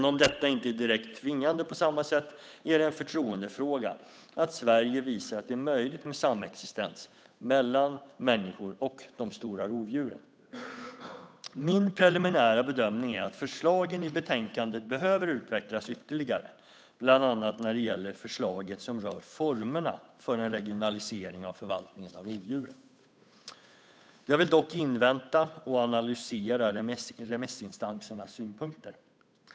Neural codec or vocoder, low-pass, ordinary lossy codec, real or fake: codec, 16 kHz, 8 kbps, FunCodec, trained on Chinese and English, 25 frames a second; none; none; fake